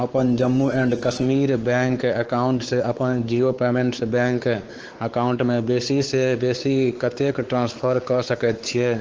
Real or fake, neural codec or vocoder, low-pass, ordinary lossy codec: fake; codec, 16 kHz, 4 kbps, X-Codec, WavLM features, trained on Multilingual LibriSpeech; 7.2 kHz; Opus, 16 kbps